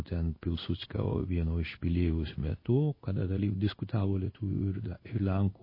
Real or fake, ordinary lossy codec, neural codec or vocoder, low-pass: fake; MP3, 24 kbps; codec, 16 kHz in and 24 kHz out, 1 kbps, XY-Tokenizer; 5.4 kHz